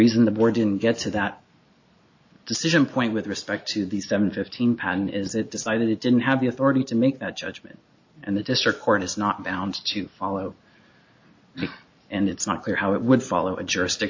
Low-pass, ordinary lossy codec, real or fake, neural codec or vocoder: 7.2 kHz; AAC, 48 kbps; real; none